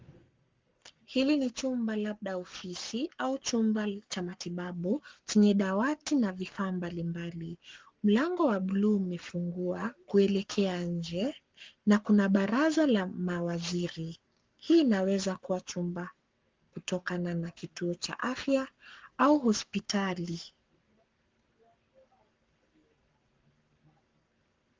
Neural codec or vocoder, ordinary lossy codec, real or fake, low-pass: codec, 44.1 kHz, 7.8 kbps, Pupu-Codec; Opus, 32 kbps; fake; 7.2 kHz